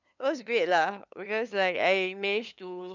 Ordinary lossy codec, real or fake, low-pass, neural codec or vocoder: none; fake; 7.2 kHz; codec, 16 kHz, 2 kbps, FunCodec, trained on LibriTTS, 25 frames a second